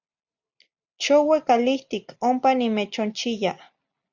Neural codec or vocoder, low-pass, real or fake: none; 7.2 kHz; real